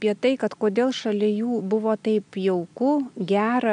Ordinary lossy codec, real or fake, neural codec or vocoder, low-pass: AAC, 96 kbps; real; none; 9.9 kHz